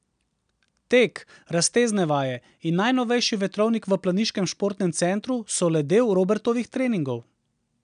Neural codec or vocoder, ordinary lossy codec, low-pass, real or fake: none; none; 9.9 kHz; real